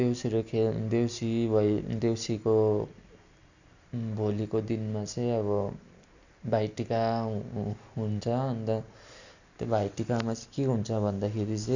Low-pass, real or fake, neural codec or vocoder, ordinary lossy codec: 7.2 kHz; real; none; none